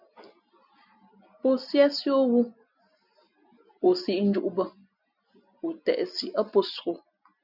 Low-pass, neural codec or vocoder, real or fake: 5.4 kHz; none; real